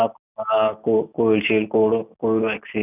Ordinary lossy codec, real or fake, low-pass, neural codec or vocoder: none; real; 3.6 kHz; none